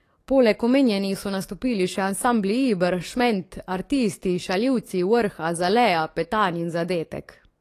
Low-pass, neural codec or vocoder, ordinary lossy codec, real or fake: 14.4 kHz; codec, 44.1 kHz, 7.8 kbps, DAC; AAC, 48 kbps; fake